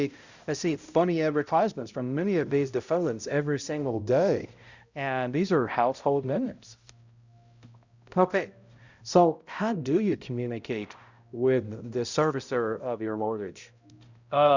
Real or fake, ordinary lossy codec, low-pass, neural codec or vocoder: fake; Opus, 64 kbps; 7.2 kHz; codec, 16 kHz, 0.5 kbps, X-Codec, HuBERT features, trained on balanced general audio